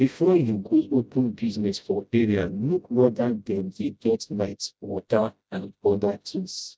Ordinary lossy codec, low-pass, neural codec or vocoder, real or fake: none; none; codec, 16 kHz, 0.5 kbps, FreqCodec, smaller model; fake